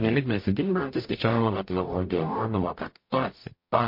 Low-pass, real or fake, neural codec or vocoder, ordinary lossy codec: 5.4 kHz; fake; codec, 44.1 kHz, 0.9 kbps, DAC; MP3, 32 kbps